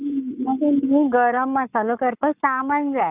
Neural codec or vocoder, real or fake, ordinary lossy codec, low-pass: codec, 44.1 kHz, 7.8 kbps, Pupu-Codec; fake; none; 3.6 kHz